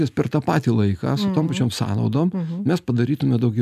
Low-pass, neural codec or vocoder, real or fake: 14.4 kHz; none; real